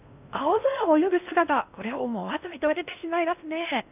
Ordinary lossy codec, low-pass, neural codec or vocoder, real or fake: none; 3.6 kHz; codec, 16 kHz in and 24 kHz out, 0.6 kbps, FocalCodec, streaming, 4096 codes; fake